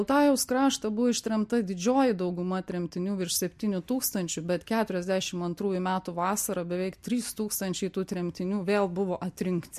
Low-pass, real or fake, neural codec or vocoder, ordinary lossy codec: 14.4 kHz; real; none; MP3, 64 kbps